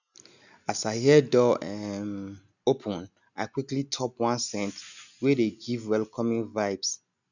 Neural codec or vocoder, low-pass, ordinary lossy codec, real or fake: none; 7.2 kHz; none; real